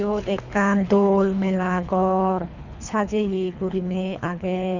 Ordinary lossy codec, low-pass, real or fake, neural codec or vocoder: none; 7.2 kHz; fake; codec, 24 kHz, 3 kbps, HILCodec